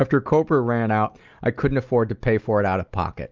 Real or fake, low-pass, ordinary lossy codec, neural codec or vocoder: real; 7.2 kHz; Opus, 24 kbps; none